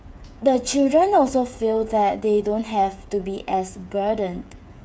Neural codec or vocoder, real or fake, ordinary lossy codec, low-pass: codec, 16 kHz, 8 kbps, FreqCodec, smaller model; fake; none; none